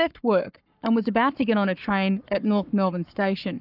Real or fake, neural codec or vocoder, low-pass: fake; codec, 44.1 kHz, 7.8 kbps, Pupu-Codec; 5.4 kHz